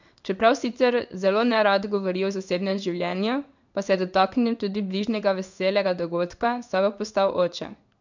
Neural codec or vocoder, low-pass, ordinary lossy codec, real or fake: codec, 16 kHz in and 24 kHz out, 1 kbps, XY-Tokenizer; 7.2 kHz; none; fake